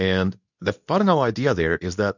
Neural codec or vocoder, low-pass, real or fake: codec, 24 kHz, 0.9 kbps, WavTokenizer, medium speech release version 2; 7.2 kHz; fake